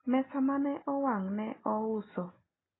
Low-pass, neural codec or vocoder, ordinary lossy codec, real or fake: 7.2 kHz; none; AAC, 16 kbps; real